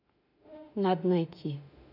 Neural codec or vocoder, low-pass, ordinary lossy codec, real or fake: autoencoder, 48 kHz, 32 numbers a frame, DAC-VAE, trained on Japanese speech; 5.4 kHz; none; fake